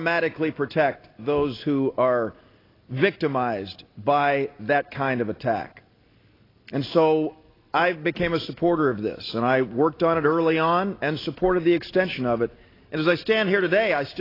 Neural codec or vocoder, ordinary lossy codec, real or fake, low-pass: none; AAC, 24 kbps; real; 5.4 kHz